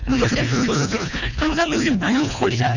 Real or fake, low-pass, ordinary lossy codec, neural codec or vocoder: fake; 7.2 kHz; none; codec, 24 kHz, 1.5 kbps, HILCodec